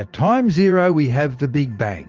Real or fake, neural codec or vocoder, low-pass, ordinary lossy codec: fake; vocoder, 44.1 kHz, 80 mel bands, Vocos; 7.2 kHz; Opus, 32 kbps